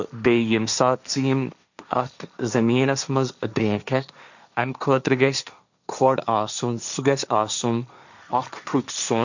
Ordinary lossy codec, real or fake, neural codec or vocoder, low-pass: none; fake; codec, 16 kHz, 1.1 kbps, Voila-Tokenizer; 7.2 kHz